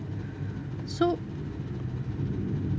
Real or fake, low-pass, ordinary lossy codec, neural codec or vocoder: real; none; none; none